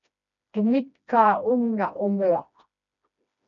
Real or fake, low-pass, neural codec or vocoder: fake; 7.2 kHz; codec, 16 kHz, 1 kbps, FreqCodec, smaller model